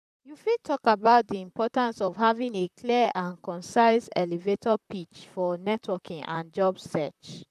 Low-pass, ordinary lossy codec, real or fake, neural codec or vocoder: 14.4 kHz; none; fake; vocoder, 44.1 kHz, 128 mel bands, Pupu-Vocoder